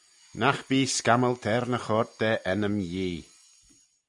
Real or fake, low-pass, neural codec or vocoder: real; 10.8 kHz; none